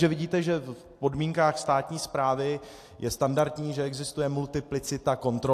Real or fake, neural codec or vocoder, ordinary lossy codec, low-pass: real; none; AAC, 64 kbps; 14.4 kHz